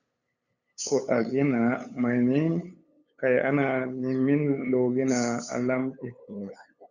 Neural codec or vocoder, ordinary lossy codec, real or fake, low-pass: codec, 16 kHz, 8 kbps, FunCodec, trained on LibriTTS, 25 frames a second; Opus, 64 kbps; fake; 7.2 kHz